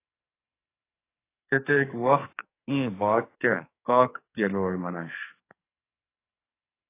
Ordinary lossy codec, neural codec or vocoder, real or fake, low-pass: AAC, 24 kbps; codec, 44.1 kHz, 2.6 kbps, SNAC; fake; 3.6 kHz